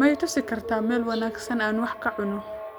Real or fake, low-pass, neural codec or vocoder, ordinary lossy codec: real; none; none; none